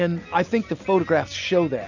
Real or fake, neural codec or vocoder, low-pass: real; none; 7.2 kHz